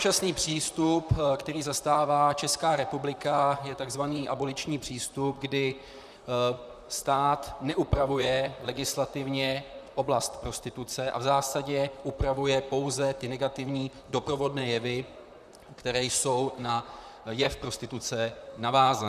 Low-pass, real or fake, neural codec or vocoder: 14.4 kHz; fake; vocoder, 44.1 kHz, 128 mel bands, Pupu-Vocoder